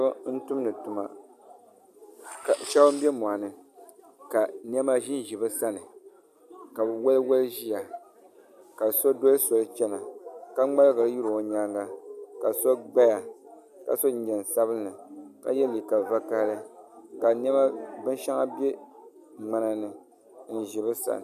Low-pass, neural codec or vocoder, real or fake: 14.4 kHz; none; real